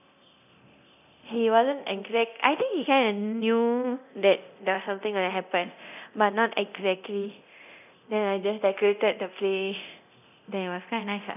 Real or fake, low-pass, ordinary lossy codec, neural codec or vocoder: fake; 3.6 kHz; none; codec, 24 kHz, 0.9 kbps, DualCodec